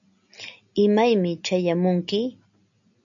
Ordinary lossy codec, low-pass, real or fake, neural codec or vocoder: MP3, 96 kbps; 7.2 kHz; real; none